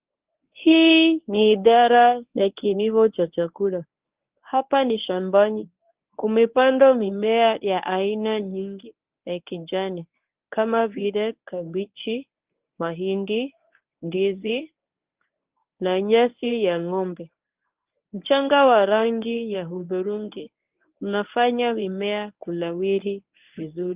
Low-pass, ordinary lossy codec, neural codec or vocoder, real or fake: 3.6 kHz; Opus, 32 kbps; codec, 24 kHz, 0.9 kbps, WavTokenizer, medium speech release version 1; fake